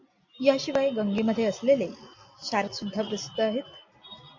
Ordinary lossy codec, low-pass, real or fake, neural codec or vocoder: MP3, 64 kbps; 7.2 kHz; real; none